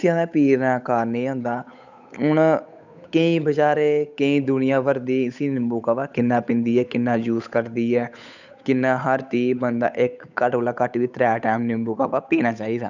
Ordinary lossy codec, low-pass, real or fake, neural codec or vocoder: none; 7.2 kHz; fake; codec, 16 kHz, 8 kbps, FunCodec, trained on Chinese and English, 25 frames a second